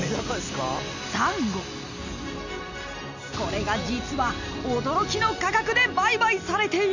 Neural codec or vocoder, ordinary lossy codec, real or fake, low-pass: none; none; real; 7.2 kHz